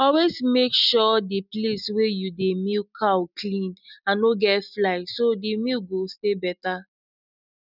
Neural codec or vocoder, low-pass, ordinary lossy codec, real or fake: none; 5.4 kHz; none; real